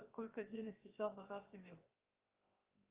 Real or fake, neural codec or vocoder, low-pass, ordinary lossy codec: fake; codec, 16 kHz, 0.7 kbps, FocalCodec; 3.6 kHz; Opus, 32 kbps